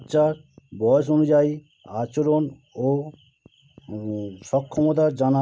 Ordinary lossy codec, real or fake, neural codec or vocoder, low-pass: none; real; none; none